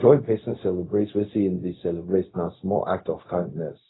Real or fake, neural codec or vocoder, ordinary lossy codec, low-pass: fake; codec, 16 kHz, 0.4 kbps, LongCat-Audio-Codec; AAC, 16 kbps; 7.2 kHz